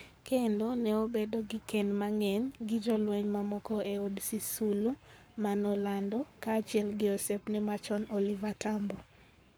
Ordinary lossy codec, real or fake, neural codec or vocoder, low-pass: none; fake; codec, 44.1 kHz, 7.8 kbps, Pupu-Codec; none